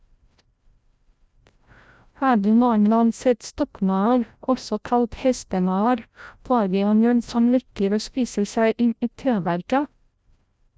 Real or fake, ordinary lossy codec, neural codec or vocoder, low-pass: fake; none; codec, 16 kHz, 0.5 kbps, FreqCodec, larger model; none